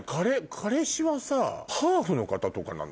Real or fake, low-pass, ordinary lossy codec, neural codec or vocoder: real; none; none; none